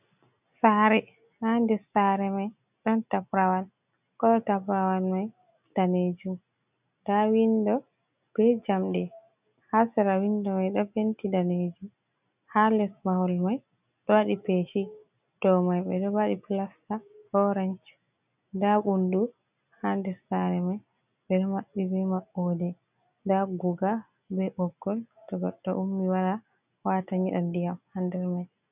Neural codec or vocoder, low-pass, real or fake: none; 3.6 kHz; real